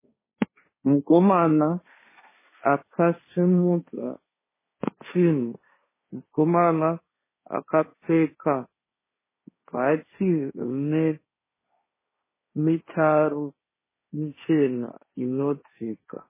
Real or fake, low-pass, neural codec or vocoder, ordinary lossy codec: fake; 3.6 kHz; codec, 16 kHz, 1.1 kbps, Voila-Tokenizer; MP3, 16 kbps